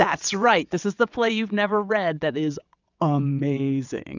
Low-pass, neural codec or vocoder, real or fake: 7.2 kHz; vocoder, 22.05 kHz, 80 mel bands, WaveNeXt; fake